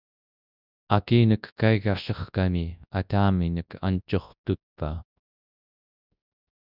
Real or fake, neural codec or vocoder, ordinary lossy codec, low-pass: fake; codec, 24 kHz, 0.9 kbps, WavTokenizer, large speech release; Opus, 64 kbps; 5.4 kHz